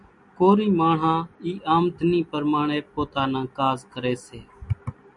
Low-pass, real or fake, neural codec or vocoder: 10.8 kHz; real; none